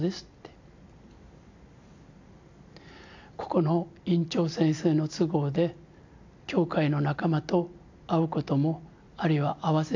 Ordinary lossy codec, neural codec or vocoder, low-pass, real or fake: AAC, 48 kbps; none; 7.2 kHz; real